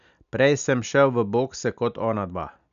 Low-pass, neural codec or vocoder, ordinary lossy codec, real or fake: 7.2 kHz; none; none; real